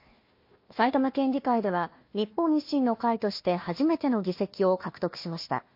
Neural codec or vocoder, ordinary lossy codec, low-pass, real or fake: codec, 16 kHz, 1 kbps, FunCodec, trained on Chinese and English, 50 frames a second; MP3, 32 kbps; 5.4 kHz; fake